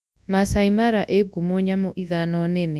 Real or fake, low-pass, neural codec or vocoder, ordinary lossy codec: fake; none; codec, 24 kHz, 0.9 kbps, WavTokenizer, large speech release; none